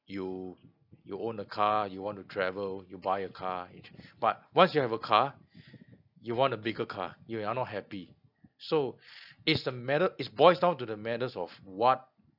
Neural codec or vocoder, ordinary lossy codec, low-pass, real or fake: none; none; 5.4 kHz; real